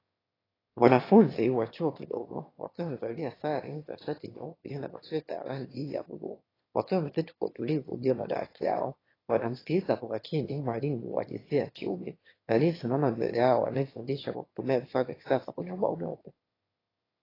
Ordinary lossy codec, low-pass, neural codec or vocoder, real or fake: AAC, 24 kbps; 5.4 kHz; autoencoder, 22.05 kHz, a latent of 192 numbers a frame, VITS, trained on one speaker; fake